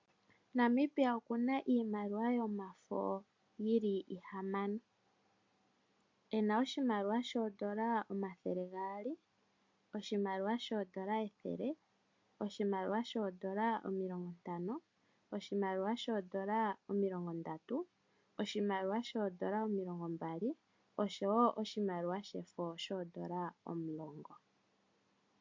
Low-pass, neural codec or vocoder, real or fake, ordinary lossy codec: 7.2 kHz; none; real; MP3, 48 kbps